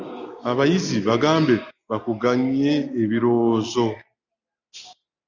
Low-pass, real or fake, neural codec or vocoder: 7.2 kHz; real; none